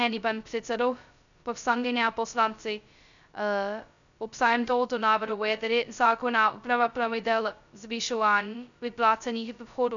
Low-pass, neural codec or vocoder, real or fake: 7.2 kHz; codec, 16 kHz, 0.2 kbps, FocalCodec; fake